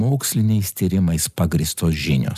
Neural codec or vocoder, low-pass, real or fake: none; 14.4 kHz; real